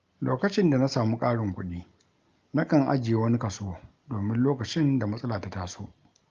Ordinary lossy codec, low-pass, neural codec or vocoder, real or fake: Opus, 32 kbps; 7.2 kHz; none; real